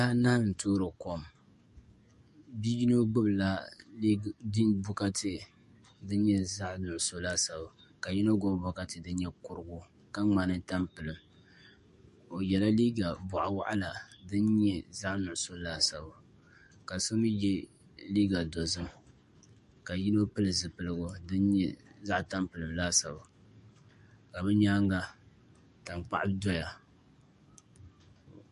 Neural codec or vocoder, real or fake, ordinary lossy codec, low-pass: codec, 44.1 kHz, 7.8 kbps, DAC; fake; MP3, 48 kbps; 14.4 kHz